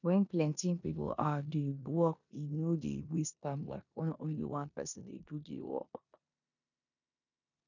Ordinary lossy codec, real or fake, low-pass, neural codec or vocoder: none; fake; 7.2 kHz; codec, 16 kHz in and 24 kHz out, 0.9 kbps, LongCat-Audio-Codec, four codebook decoder